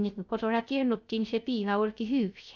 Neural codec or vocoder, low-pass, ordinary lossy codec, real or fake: codec, 16 kHz, 0.3 kbps, FocalCodec; none; none; fake